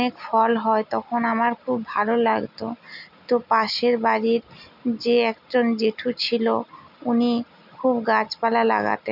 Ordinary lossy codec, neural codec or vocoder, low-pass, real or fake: none; none; 5.4 kHz; real